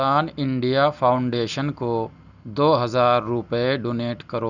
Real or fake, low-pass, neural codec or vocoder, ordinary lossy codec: real; 7.2 kHz; none; none